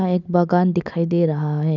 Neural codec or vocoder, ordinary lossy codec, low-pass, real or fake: none; none; 7.2 kHz; real